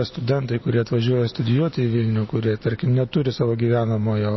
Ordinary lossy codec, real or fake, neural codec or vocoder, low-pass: MP3, 24 kbps; real; none; 7.2 kHz